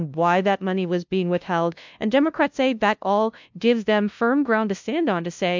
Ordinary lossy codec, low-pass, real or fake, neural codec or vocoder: MP3, 64 kbps; 7.2 kHz; fake; codec, 16 kHz, 0.5 kbps, FunCodec, trained on LibriTTS, 25 frames a second